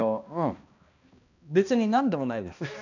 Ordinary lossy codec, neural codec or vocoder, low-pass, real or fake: none; codec, 16 kHz, 1 kbps, X-Codec, HuBERT features, trained on balanced general audio; 7.2 kHz; fake